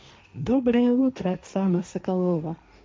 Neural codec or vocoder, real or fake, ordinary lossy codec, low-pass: codec, 16 kHz, 1.1 kbps, Voila-Tokenizer; fake; none; none